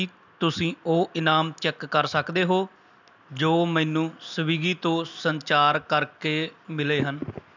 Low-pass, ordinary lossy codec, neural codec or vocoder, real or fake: 7.2 kHz; none; none; real